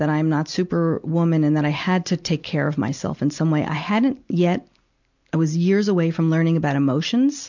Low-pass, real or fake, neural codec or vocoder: 7.2 kHz; real; none